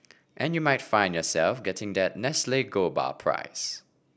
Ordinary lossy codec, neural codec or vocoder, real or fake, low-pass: none; none; real; none